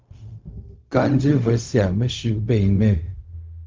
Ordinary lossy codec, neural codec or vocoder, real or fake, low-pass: Opus, 32 kbps; codec, 16 kHz, 0.4 kbps, LongCat-Audio-Codec; fake; 7.2 kHz